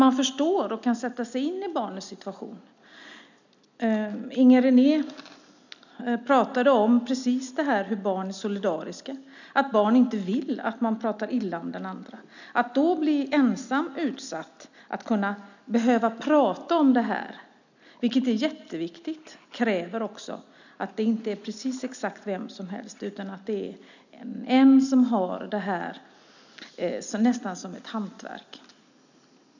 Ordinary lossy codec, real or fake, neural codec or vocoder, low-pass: none; real; none; 7.2 kHz